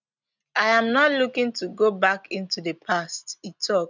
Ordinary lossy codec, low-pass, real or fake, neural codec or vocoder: none; 7.2 kHz; real; none